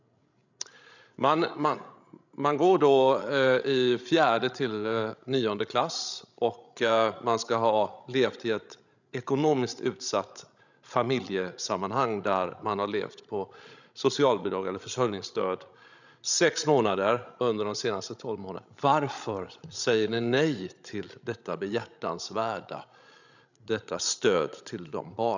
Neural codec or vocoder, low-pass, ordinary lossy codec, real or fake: codec, 16 kHz, 16 kbps, FreqCodec, larger model; 7.2 kHz; none; fake